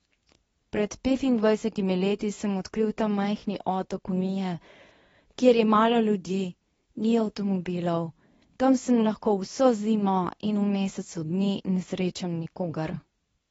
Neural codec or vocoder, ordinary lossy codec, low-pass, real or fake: codec, 24 kHz, 0.9 kbps, WavTokenizer, medium speech release version 2; AAC, 24 kbps; 10.8 kHz; fake